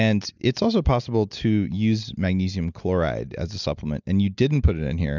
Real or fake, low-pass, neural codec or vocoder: real; 7.2 kHz; none